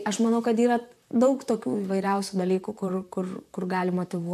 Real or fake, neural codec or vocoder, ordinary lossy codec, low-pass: fake; vocoder, 44.1 kHz, 128 mel bands, Pupu-Vocoder; AAC, 96 kbps; 14.4 kHz